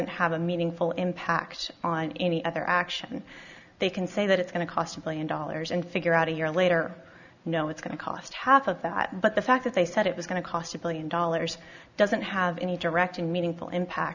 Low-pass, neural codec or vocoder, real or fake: 7.2 kHz; none; real